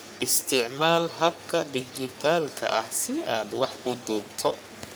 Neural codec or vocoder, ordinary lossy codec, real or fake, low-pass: codec, 44.1 kHz, 3.4 kbps, Pupu-Codec; none; fake; none